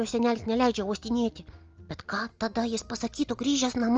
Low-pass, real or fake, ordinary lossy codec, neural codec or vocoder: 7.2 kHz; real; Opus, 24 kbps; none